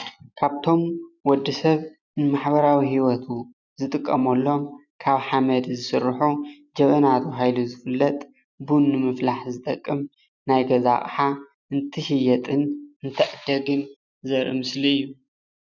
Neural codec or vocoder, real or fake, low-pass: none; real; 7.2 kHz